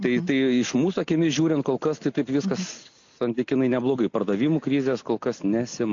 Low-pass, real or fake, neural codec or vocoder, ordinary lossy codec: 7.2 kHz; real; none; AAC, 48 kbps